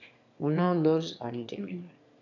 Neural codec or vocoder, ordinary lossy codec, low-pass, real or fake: autoencoder, 22.05 kHz, a latent of 192 numbers a frame, VITS, trained on one speaker; AAC, 48 kbps; 7.2 kHz; fake